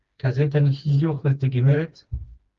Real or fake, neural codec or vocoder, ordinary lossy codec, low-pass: fake; codec, 16 kHz, 2 kbps, FreqCodec, smaller model; Opus, 32 kbps; 7.2 kHz